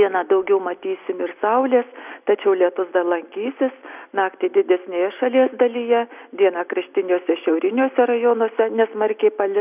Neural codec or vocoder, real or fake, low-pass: none; real; 3.6 kHz